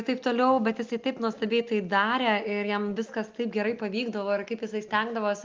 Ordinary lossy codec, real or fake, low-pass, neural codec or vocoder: Opus, 24 kbps; real; 7.2 kHz; none